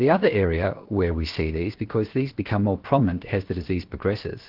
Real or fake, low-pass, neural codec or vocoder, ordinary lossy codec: fake; 5.4 kHz; codec, 16 kHz, 0.7 kbps, FocalCodec; Opus, 16 kbps